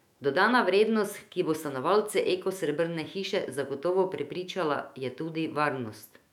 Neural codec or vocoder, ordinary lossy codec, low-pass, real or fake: autoencoder, 48 kHz, 128 numbers a frame, DAC-VAE, trained on Japanese speech; none; 19.8 kHz; fake